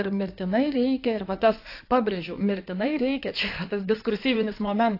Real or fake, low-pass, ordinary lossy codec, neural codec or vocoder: fake; 5.4 kHz; AAC, 32 kbps; codec, 16 kHz in and 24 kHz out, 2.2 kbps, FireRedTTS-2 codec